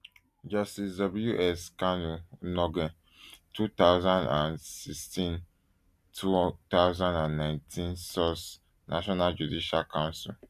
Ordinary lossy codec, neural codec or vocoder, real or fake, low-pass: AAC, 96 kbps; none; real; 14.4 kHz